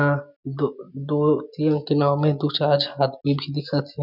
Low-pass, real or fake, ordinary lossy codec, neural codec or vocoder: 5.4 kHz; real; none; none